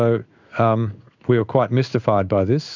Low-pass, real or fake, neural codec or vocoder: 7.2 kHz; fake; codec, 16 kHz in and 24 kHz out, 1 kbps, XY-Tokenizer